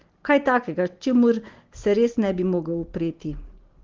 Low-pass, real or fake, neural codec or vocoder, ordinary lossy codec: 7.2 kHz; real; none; Opus, 16 kbps